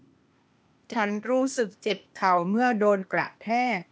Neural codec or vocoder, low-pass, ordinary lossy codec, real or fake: codec, 16 kHz, 0.8 kbps, ZipCodec; none; none; fake